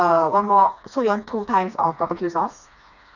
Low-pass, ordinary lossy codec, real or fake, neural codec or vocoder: 7.2 kHz; none; fake; codec, 16 kHz, 2 kbps, FreqCodec, smaller model